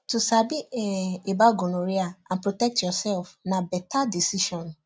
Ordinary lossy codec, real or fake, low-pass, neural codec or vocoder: none; real; none; none